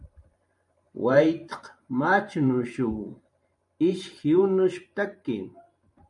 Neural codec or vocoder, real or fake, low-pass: vocoder, 44.1 kHz, 128 mel bands every 512 samples, BigVGAN v2; fake; 10.8 kHz